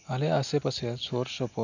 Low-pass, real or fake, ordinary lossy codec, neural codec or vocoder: 7.2 kHz; real; none; none